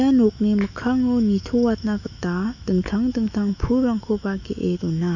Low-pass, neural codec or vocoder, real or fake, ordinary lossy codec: 7.2 kHz; autoencoder, 48 kHz, 128 numbers a frame, DAC-VAE, trained on Japanese speech; fake; none